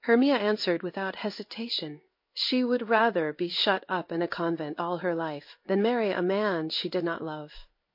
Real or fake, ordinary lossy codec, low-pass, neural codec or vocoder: fake; MP3, 32 kbps; 5.4 kHz; codec, 16 kHz in and 24 kHz out, 1 kbps, XY-Tokenizer